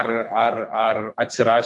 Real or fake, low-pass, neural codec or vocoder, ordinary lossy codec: fake; 10.8 kHz; codec, 24 kHz, 3 kbps, HILCodec; AAC, 64 kbps